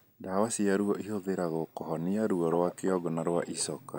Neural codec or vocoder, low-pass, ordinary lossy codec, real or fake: none; none; none; real